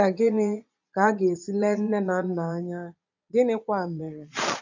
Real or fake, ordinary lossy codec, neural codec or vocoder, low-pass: fake; none; vocoder, 22.05 kHz, 80 mel bands, Vocos; 7.2 kHz